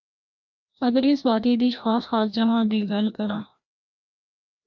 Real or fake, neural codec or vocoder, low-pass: fake; codec, 16 kHz, 1 kbps, FreqCodec, larger model; 7.2 kHz